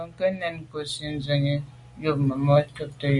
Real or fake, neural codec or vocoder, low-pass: real; none; 10.8 kHz